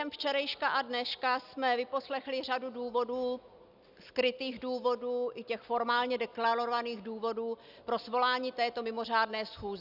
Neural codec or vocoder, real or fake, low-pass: none; real; 5.4 kHz